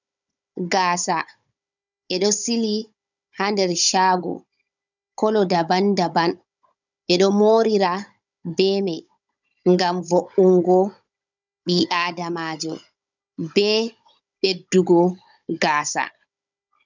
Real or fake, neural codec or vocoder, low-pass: fake; codec, 16 kHz, 16 kbps, FunCodec, trained on Chinese and English, 50 frames a second; 7.2 kHz